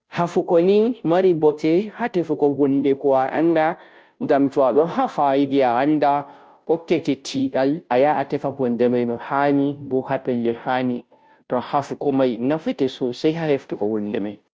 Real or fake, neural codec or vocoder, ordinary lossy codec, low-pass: fake; codec, 16 kHz, 0.5 kbps, FunCodec, trained on Chinese and English, 25 frames a second; none; none